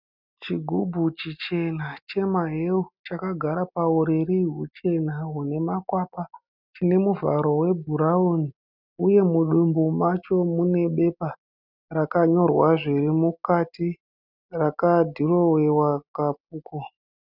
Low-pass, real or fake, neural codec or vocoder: 5.4 kHz; real; none